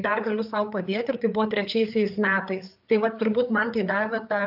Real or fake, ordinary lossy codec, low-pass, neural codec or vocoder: fake; AAC, 48 kbps; 5.4 kHz; codec, 16 kHz, 4 kbps, FreqCodec, larger model